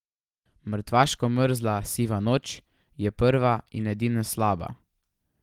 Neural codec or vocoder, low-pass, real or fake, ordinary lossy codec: none; 19.8 kHz; real; Opus, 24 kbps